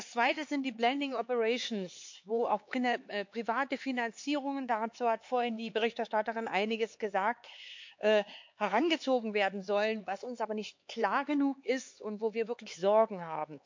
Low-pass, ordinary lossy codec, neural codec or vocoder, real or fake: 7.2 kHz; MP3, 48 kbps; codec, 16 kHz, 4 kbps, X-Codec, HuBERT features, trained on LibriSpeech; fake